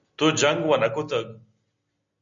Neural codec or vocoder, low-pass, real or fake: none; 7.2 kHz; real